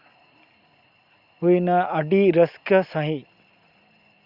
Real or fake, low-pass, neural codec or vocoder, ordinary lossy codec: real; 5.4 kHz; none; Opus, 64 kbps